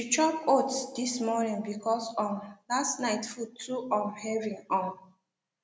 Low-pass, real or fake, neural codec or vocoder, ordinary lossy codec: none; real; none; none